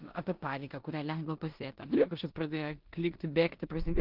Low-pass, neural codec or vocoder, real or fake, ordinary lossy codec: 5.4 kHz; codec, 16 kHz in and 24 kHz out, 0.9 kbps, LongCat-Audio-Codec, four codebook decoder; fake; Opus, 32 kbps